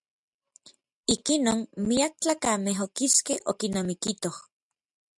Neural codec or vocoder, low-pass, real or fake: none; 10.8 kHz; real